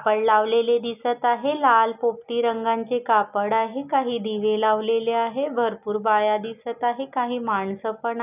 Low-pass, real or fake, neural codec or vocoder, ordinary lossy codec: 3.6 kHz; real; none; none